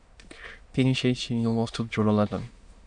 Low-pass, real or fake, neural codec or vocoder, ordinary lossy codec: 9.9 kHz; fake; autoencoder, 22.05 kHz, a latent of 192 numbers a frame, VITS, trained on many speakers; Opus, 64 kbps